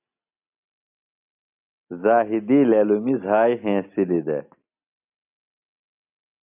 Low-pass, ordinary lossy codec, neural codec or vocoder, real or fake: 3.6 kHz; MP3, 32 kbps; none; real